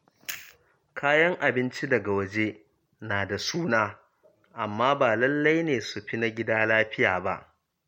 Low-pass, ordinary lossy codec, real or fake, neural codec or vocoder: 19.8 kHz; MP3, 64 kbps; real; none